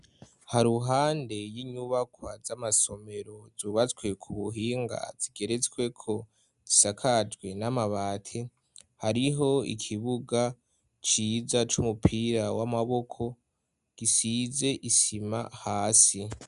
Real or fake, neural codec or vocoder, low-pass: real; none; 10.8 kHz